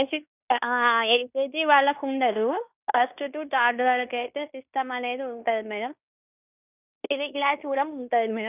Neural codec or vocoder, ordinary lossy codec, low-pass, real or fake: codec, 16 kHz in and 24 kHz out, 0.9 kbps, LongCat-Audio-Codec, fine tuned four codebook decoder; none; 3.6 kHz; fake